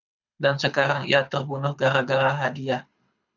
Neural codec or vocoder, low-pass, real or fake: codec, 24 kHz, 6 kbps, HILCodec; 7.2 kHz; fake